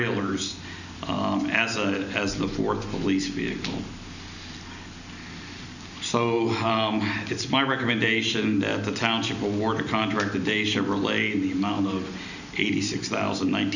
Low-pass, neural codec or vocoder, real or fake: 7.2 kHz; none; real